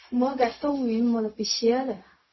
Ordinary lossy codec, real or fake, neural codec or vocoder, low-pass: MP3, 24 kbps; fake; codec, 16 kHz, 0.4 kbps, LongCat-Audio-Codec; 7.2 kHz